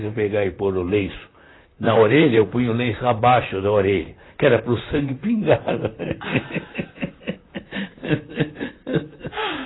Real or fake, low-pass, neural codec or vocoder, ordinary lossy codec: fake; 7.2 kHz; vocoder, 44.1 kHz, 128 mel bands, Pupu-Vocoder; AAC, 16 kbps